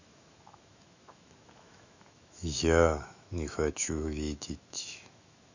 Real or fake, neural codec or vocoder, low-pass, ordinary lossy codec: fake; autoencoder, 48 kHz, 128 numbers a frame, DAC-VAE, trained on Japanese speech; 7.2 kHz; none